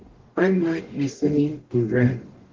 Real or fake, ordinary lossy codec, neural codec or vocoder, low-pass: fake; Opus, 16 kbps; codec, 44.1 kHz, 0.9 kbps, DAC; 7.2 kHz